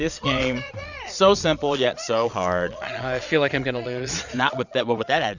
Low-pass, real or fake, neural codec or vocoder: 7.2 kHz; fake; vocoder, 22.05 kHz, 80 mel bands, Vocos